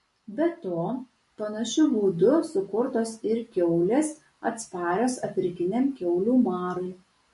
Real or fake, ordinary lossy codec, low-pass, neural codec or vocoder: real; MP3, 48 kbps; 14.4 kHz; none